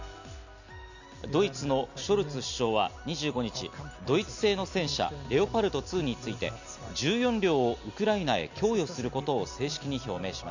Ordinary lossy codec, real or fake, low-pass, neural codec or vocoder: none; real; 7.2 kHz; none